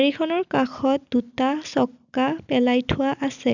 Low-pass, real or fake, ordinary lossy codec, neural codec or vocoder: 7.2 kHz; real; none; none